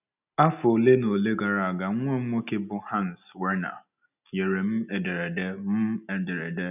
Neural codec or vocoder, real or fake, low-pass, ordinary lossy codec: none; real; 3.6 kHz; none